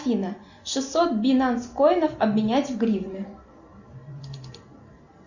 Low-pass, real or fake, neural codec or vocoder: 7.2 kHz; real; none